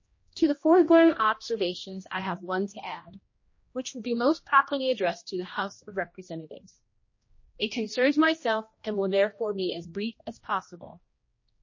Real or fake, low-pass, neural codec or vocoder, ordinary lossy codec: fake; 7.2 kHz; codec, 16 kHz, 1 kbps, X-Codec, HuBERT features, trained on general audio; MP3, 32 kbps